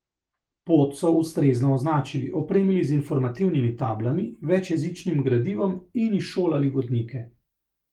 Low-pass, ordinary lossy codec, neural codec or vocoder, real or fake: 19.8 kHz; Opus, 24 kbps; autoencoder, 48 kHz, 128 numbers a frame, DAC-VAE, trained on Japanese speech; fake